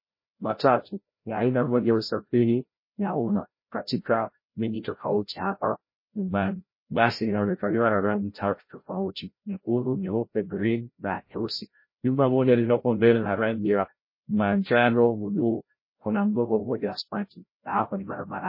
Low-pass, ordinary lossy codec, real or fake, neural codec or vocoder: 5.4 kHz; MP3, 24 kbps; fake; codec, 16 kHz, 0.5 kbps, FreqCodec, larger model